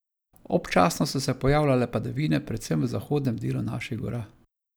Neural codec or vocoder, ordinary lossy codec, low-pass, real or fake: none; none; none; real